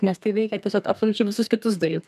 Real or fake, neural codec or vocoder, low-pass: fake; codec, 32 kHz, 1.9 kbps, SNAC; 14.4 kHz